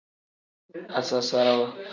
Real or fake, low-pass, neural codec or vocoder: real; 7.2 kHz; none